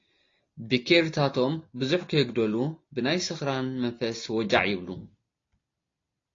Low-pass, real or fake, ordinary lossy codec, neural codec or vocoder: 7.2 kHz; real; AAC, 32 kbps; none